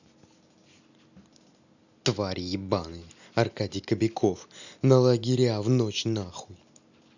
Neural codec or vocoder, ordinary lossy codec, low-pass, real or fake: none; none; 7.2 kHz; real